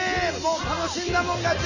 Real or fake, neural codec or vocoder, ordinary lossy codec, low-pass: real; none; none; 7.2 kHz